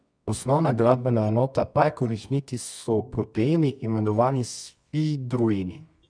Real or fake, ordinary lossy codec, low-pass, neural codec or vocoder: fake; none; 9.9 kHz; codec, 24 kHz, 0.9 kbps, WavTokenizer, medium music audio release